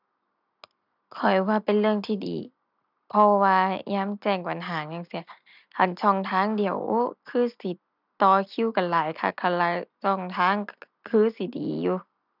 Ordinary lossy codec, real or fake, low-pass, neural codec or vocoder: none; real; 5.4 kHz; none